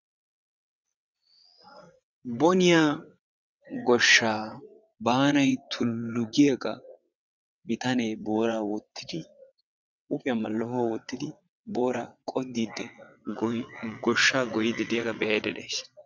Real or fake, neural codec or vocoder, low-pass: fake; vocoder, 22.05 kHz, 80 mel bands, WaveNeXt; 7.2 kHz